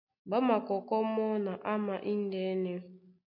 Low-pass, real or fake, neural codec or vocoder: 5.4 kHz; real; none